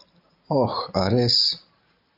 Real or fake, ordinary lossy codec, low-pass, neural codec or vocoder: real; AAC, 48 kbps; 5.4 kHz; none